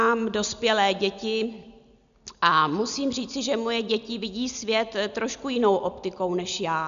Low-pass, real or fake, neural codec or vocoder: 7.2 kHz; real; none